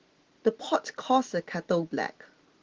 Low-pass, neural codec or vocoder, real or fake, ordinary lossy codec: 7.2 kHz; none; real; Opus, 16 kbps